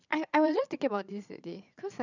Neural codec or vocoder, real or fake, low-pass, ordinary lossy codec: vocoder, 22.05 kHz, 80 mel bands, Vocos; fake; 7.2 kHz; none